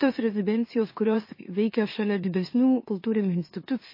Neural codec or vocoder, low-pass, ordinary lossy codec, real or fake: autoencoder, 44.1 kHz, a latent of 192 numbers a frame, MeloTTS; 5.4 kHz; MP3, 24 kbps; fake